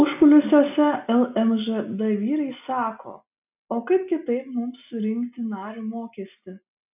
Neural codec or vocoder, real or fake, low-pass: none; real; 3.6 kHz